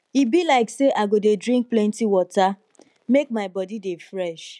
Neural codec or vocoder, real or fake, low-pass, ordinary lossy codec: none; real; none; none